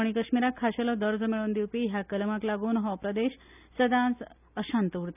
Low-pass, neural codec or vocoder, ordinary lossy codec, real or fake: 3.6 kHz; none; none; real